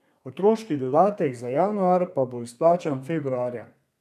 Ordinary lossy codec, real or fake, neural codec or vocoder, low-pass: none; fake; codec, 32 kHz, 1.9 kbps, SNAC; 14.4 kHz